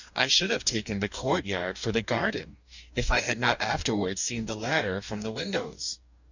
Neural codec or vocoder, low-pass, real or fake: codec, 44.1 kHz, 2.6 kbps, DAC; 7.2 kHz; fake